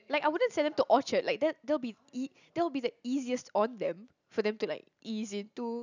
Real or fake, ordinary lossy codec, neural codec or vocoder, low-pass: real; none; none; 7.2 kHz